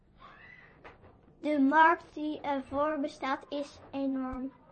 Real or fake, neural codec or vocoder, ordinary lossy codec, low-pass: fake; vocoder, 24 kHz, 100 mel bands, Vocos; MP3, 32 kbps; 10.8 kHz